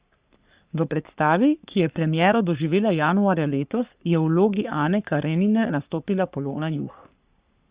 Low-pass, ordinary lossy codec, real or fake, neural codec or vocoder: 3.6 kHz; Opus, 64 kbps; fake; codec, 44.1 kHz, 3.4 kbps, Pupu-Codec